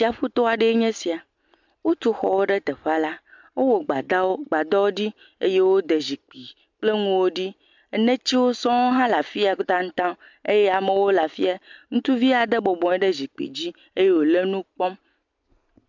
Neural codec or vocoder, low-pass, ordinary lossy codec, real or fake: none; 7.2 kHz; MP3, 64 kbps; real